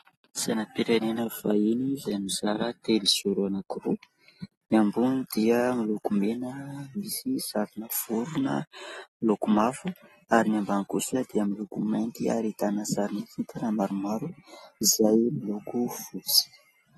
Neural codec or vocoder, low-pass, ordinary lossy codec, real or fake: none; 14.4 kHz; AAC, 32 kbps; real